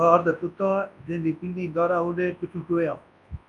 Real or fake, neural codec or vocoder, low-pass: fake; codec, 24 kHz, 0.9 kbps, WavTokenizer, large speech release; 10.8 kHz